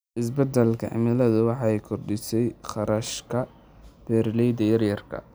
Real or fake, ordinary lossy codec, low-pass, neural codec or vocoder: real; none; none; none